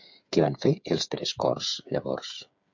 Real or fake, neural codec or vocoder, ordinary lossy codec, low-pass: fake; codec, 16 kHz, 8 kbps, FreqCodec, smaller model; MP3, 64 kbps; 7.2 kHz